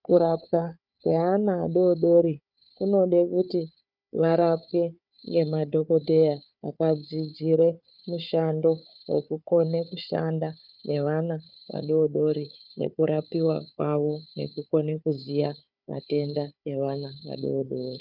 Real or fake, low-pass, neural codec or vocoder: fake; 5.4 kHz; codec, 16 kHz, 4 kbps, FunCodec, trained on Chinese and English, 50 frames a second